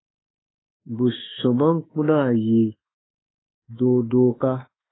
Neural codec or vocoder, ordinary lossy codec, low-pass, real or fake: autoencoder, 48 kHz, 32 numbers a frame, DAC-VAE, trained on Japanese speech; AAC, 16 kbps; 7.2 kHz; fake